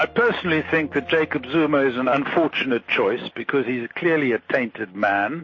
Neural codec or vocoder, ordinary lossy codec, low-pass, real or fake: none; MP3, 32 kbps; 7.2 kHz; real